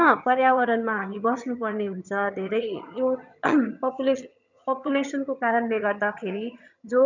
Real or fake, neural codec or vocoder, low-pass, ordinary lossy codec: fake; vocoder, 22.05 kHz, 80 mel bands, HiFi-GAN; 7.2 kHz; none